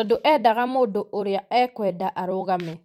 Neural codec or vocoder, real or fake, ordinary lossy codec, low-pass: vocoder, 44.1 kHz, 128 mel bands every 256 samples, BigVGAN v2; fake; MP3, 64 kbps; 19.8 kHz